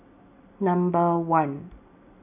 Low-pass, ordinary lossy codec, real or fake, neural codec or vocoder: 3.6 kHz; none; real; none